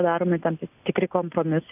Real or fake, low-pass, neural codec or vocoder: real; 3.6 kHz; none